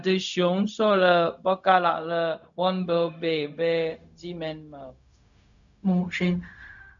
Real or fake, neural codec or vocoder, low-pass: fake; codec, 16 kHz, 0.4 kbps, LongCat-Audio-Codec; 7.2 kHz